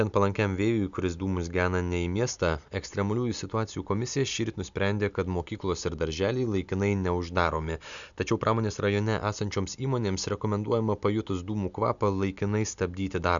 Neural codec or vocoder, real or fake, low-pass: none; real; 7.2 kHz